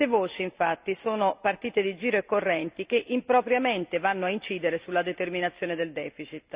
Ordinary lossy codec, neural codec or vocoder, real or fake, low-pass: Opus, 64 kbps; none; real; 3.6 kHz